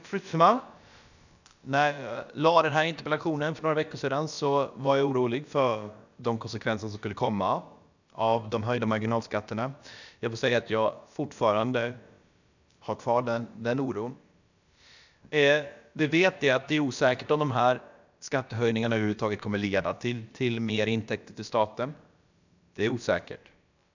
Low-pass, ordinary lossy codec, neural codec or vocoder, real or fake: 7.2 kHz; none; codec, 16 kHz, about 1 kbps, DyCAST, with the encoder's durations; fake